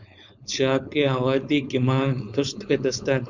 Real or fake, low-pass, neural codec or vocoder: fake; 7.2 kHz; codec, 16 kHz, 4.8 kbps, FACodec